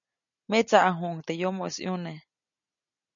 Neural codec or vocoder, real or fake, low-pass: none; real; 7.2 kHz